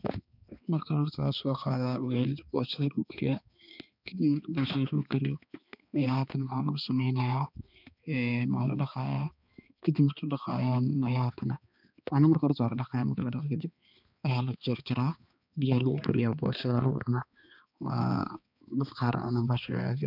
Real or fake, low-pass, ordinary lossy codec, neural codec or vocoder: fake; 5.4 kHz; none; codec, 16 kHz, 2 kbps, X-Codec, HuBERT features, trained on balanced general audio